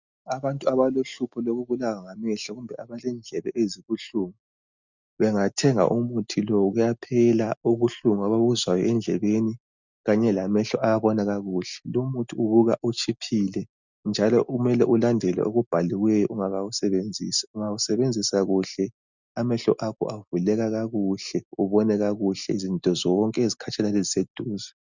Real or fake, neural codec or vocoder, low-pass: real; none; 7.2 kHz